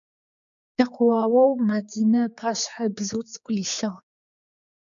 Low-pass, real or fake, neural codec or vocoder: 7.2 kHz; fake; codec, 16 kHz, 4 kbps, X-Codec, HuBERT features, trained on general audio